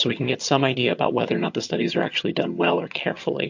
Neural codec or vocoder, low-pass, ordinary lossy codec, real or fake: vocoder, 22.05 kHz, 80 mel bands, HiFi-GAN; 7.2 kHz; MP3, 48 kbps; fake